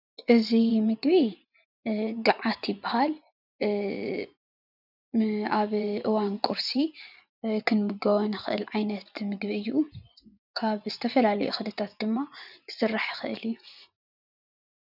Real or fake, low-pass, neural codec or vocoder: fake; 5.4 kHz; vocoder, 24 kHz, 100 mel bands, Vocos